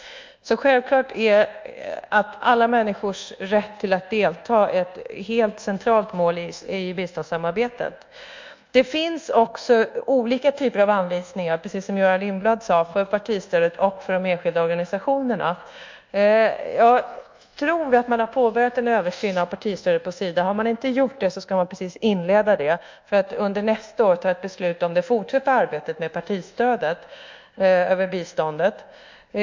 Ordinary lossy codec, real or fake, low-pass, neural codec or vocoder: MP3, 64 kbps; fake; 7.2 kHz; codec, 24 kHz, 1.2 kbps, DualCodec